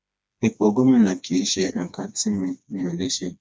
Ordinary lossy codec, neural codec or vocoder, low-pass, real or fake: none; codec, 16 kHz, 2 kbps, FreqCodec, smaller model; none; fake